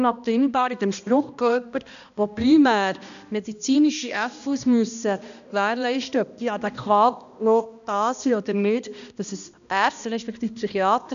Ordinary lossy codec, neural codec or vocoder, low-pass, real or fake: none; codec, 16 kHz, 1 kbps, X-Codec, HuBERT features, trained on balanced general audio; 7.2 kHz; fake